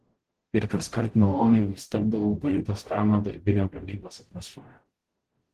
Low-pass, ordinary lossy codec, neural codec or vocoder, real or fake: 14.4 kHz; Opus, 16 kbps; codec, 44.1 kHz, 0.9 kbps, DAC; fake